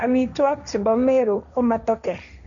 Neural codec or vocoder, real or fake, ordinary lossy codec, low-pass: codec, 16 kHz, 1.1 kbps, Voila-Tokenizer; fake; none; 7.2 kHz